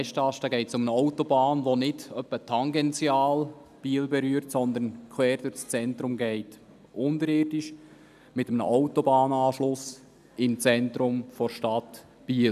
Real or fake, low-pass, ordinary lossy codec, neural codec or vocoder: real; 14.4 kHz; none; none